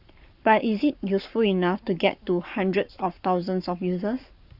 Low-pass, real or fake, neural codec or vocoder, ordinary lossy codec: 5.4 kHz; fake; codec, 44.1 kHz, 7.8 kbps, Pupu-Codec; Opus, 64 kbps